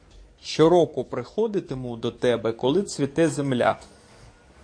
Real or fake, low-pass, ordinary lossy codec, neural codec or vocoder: fake; 9.9 kHz; MP3, 48 kbps; codec, 44.1 kHz, 7.8 kbps, DAC